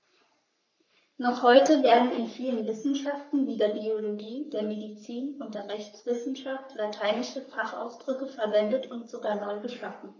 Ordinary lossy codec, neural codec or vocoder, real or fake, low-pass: none; codec, 44.1 kHz, 3.4 kbps, Pupu-Codec; fake; 7.2 kHz